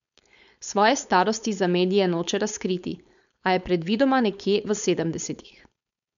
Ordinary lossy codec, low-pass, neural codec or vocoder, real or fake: none; 7.2 kHz; codec, 16 kHz, 4.8 kbps, FACodec; fake